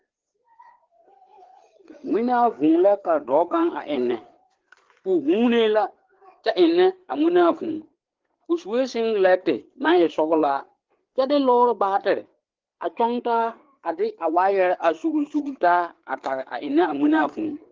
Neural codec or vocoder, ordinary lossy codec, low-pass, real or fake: codec, 16 kHz, 4 kbps, FreqCodec, larger model; Opus, 16 kbps; 7.2 kHz; fake